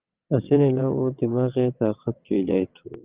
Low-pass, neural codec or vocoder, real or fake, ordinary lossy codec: 3.6 kHz; vocoder, 22.05 kHz, 80 mel bands, WaveNeXt; fake; Opus, 24 kbps